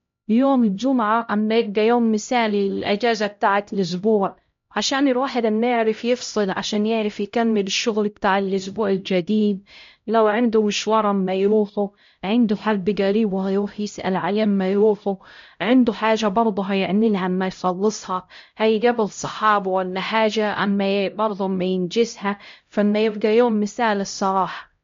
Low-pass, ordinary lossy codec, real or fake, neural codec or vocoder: 7.2 kHz; MP3, 48 kbps; fake; codec, 16 kHz, 0.5 kbps, X-Codec, HuBERT features, trained on LibriSpeech